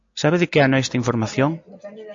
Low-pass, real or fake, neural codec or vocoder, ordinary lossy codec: 7.2 kHz; real; none; AAC, 48 kbps